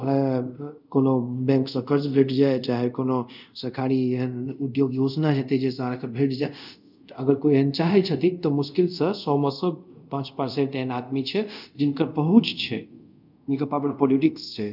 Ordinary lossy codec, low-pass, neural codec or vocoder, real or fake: none; 5.4 kHz; codec, 24 kHz, 0.5 kbps, DualCodec; fake